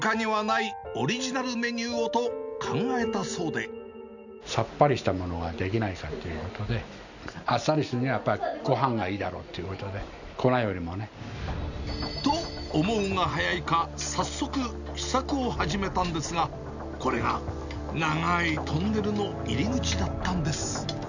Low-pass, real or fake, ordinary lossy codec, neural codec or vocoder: 7.2 kHz; real; none; none